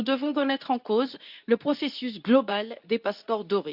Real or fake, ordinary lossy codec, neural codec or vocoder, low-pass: fake; none; codec, 24 kHz, 0.9 kbps, WavTokenizer, medium speech release version 2; 5.4 kHz